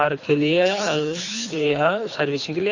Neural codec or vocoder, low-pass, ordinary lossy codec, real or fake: codec, 24 kHz, 3 kbps, HILCodec; 7.2 kHz; AAC, 48 kbps; fake